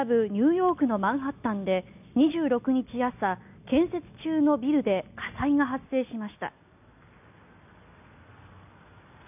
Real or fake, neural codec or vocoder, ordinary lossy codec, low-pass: real; none; none; 3.6 kHz